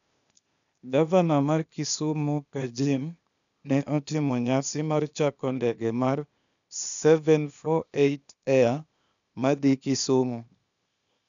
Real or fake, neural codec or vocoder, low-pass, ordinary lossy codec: fake; codec, 16 kHz, 0.8 kbps, ZipCodec; 7.2 kHz; none